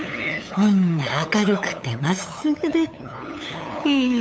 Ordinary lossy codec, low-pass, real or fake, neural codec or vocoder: none; none; fake; codec, 16 kHz, 8 kbps, FunCodec, trained on LibriTTS, 25 frames a second